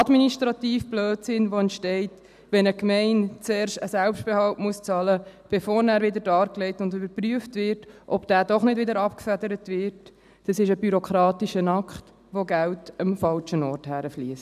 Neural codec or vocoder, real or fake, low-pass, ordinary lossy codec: none; real; 14.4 kHz; none